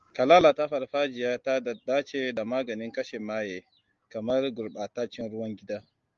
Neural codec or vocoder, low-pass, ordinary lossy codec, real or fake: none; 7.2 kHz; Opus, 32 kbps; real